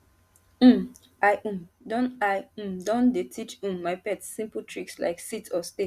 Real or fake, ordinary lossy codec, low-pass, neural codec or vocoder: real; Opus, 64 kbps; 14.4 kHz; none